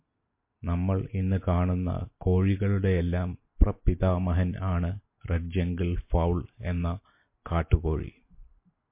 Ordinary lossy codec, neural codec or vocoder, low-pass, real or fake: MP3, 24 kbps; none; 3.6 kHz; real